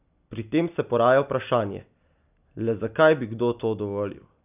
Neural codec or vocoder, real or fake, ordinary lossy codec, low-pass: none; real; none; 3.6 kHz